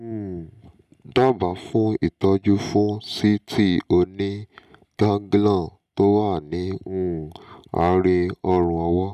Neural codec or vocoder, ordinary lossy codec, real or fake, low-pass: none; none; real; 14.4 kHz